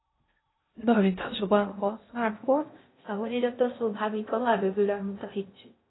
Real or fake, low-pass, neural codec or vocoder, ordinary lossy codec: fake; 7.2 kHz; codec, 16 kHz in and 24 kHz out, 0.6 kbps, FocalCodec, streaming, 2048 codes; AAC, 16 kbps